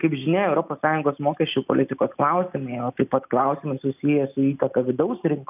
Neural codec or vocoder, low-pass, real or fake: none; 3.6 kHz; real